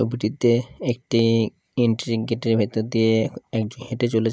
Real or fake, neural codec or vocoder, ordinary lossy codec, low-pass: real; none; none; none